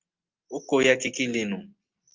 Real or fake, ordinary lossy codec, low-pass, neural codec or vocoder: real; Opus, 32 kbps; 7.2 kHz; none